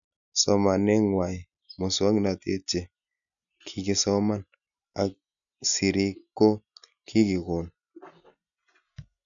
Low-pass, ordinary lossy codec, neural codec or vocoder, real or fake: 7.2 kHz; none; none; real